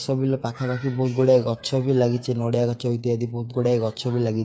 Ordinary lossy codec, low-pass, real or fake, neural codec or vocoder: none; none; fake; codec, 16 kHz, 8 kbps, FreqCodec, smaller model